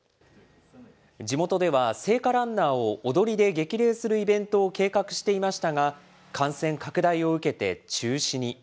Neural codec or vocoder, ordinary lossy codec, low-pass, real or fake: none; none; none; real